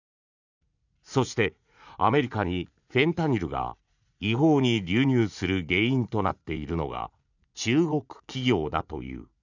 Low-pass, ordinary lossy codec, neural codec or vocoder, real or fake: 7.2 kHz; none; vocoder, 44.1 kHz, 80 mel bands, Vocos; fake